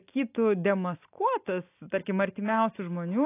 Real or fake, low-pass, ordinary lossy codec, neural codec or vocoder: real; 3.6 kHz; AAC, 24 kbps; none